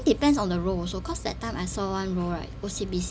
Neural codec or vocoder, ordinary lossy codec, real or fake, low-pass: none; none; real; none